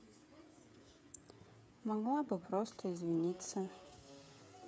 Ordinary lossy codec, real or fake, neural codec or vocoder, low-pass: none; fake; codec, 16 kHz, 16 kbps, FreqCodec, smaller model; none